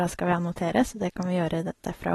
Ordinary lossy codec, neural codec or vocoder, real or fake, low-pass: AAC, 32 kbps; none; real; 14.4 kHz